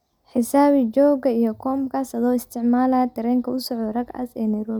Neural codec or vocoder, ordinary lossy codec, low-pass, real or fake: none; none; 19.8 kHz; real